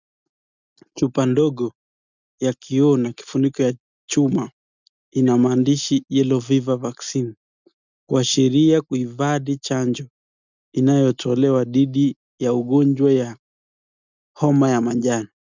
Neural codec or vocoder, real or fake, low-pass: none; real; 7.2 kHz